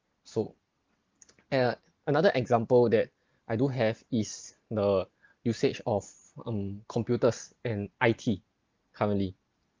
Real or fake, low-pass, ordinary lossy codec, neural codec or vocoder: real; 7.2 kHz; Opus, 32 kbps; none